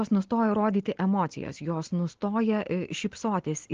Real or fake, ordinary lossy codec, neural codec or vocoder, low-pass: real; Opus, 16 kbps; none; 7.2 kHz